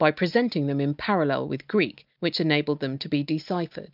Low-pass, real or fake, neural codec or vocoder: 5.4 kHz; real; none